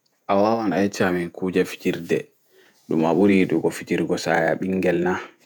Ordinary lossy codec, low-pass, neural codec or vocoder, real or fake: none; none; vocoder, 48 kHz, 128 mel bands, Vocos; fake